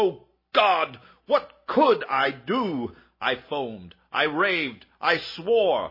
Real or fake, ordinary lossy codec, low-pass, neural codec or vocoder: real; MP3, 24 kbps; 5.4 kHz; none